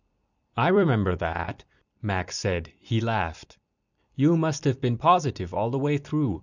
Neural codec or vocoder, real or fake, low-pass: vocoder, 44.1 kHz, 128 mel bands every 256 samples, BigVGAN v2; fake; 7.2 kHz